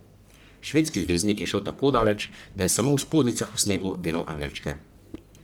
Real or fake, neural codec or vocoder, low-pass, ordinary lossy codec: fake; codec, 44.1 kHz, 1.7 kbps, Pupu-Codec; none; none